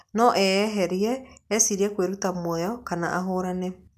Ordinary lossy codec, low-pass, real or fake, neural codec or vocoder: none; 14.4 kHz; real; none